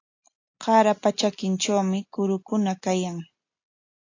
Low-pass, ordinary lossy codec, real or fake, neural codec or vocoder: 7.2 kHz; AAC, 48 kbps; real; none